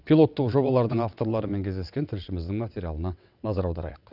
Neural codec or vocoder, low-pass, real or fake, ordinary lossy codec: vocoder, 22.05 kHz, 80 mel bands, WaveNeXt; 5.4 kHz; fake; none